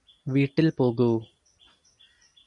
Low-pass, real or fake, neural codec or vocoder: 10.8 kHz; real; none